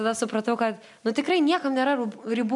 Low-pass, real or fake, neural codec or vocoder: 10.8 kHz; real; none